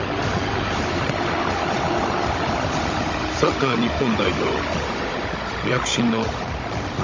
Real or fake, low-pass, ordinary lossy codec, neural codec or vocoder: fake; 7.2 kHz; Opus, 32 kbps; codec, 16 kHz, 16 kbps, FunCodec, trained on Chinese and English, 50 frames a second